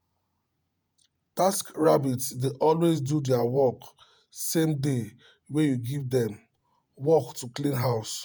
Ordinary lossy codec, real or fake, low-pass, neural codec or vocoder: none; fake; none; vocoder, 48 kHz, 128 mel bands, Vocos